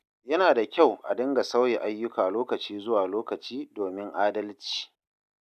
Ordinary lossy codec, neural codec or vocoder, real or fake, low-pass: none; none; real; 14.4 kHz